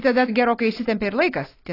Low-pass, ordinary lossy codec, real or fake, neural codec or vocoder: 5.4 kHz; AAC, 24 kbps; real; none